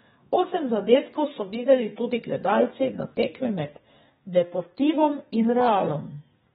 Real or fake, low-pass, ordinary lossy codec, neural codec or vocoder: fake; 14.4 kHz; AAC, 16 kbps; codec, 32 kHz, 1.9 kbps, SNAC